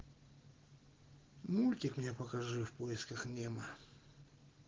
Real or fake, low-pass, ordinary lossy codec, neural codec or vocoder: real; 7.2 kHz; Opus, 16 kbps; none